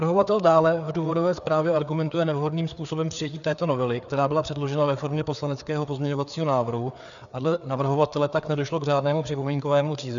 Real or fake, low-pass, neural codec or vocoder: fake; 7.2 kHz; codec, 16 kHz, 4 kbps, FreqCodec, larger model